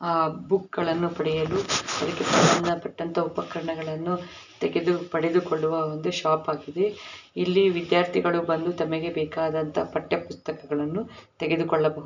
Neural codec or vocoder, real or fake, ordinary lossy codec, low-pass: none; real; none; 7.2 kHz